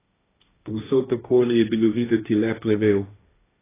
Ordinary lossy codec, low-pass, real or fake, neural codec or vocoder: AAC, 16 kbps; 3.6 kHz; fake; codec, 16 kHz, 1.1 kbps, Voila-Tokenizer